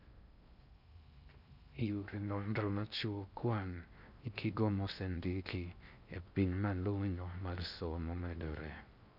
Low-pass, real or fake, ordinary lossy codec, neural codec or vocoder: 5.4 kHz; fake; none; codec, 16 kHz in and 24 kHz out, 0.6 kbps, FocalCodec, streaming, 2048 codes